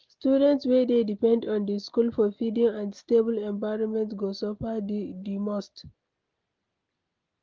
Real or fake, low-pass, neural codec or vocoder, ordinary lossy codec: real; 7.2 kHz; none; Opus, 32 kbps